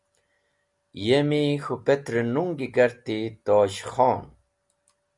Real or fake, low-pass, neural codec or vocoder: real; 10.8 kHz; none